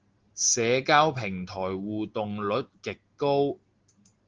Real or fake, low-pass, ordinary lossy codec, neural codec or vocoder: real; 7.2 kHz; Opus, 16 kbps; none